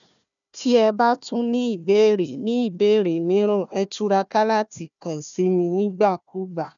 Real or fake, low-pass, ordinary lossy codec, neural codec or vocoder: fake; 7.2 kHz; none; codec, 16 kHz, 1 kbps, FunCodec, trained on Chinese and English, 50 frames a second